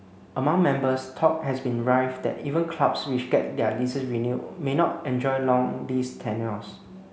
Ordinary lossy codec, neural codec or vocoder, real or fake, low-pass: none; none; real; none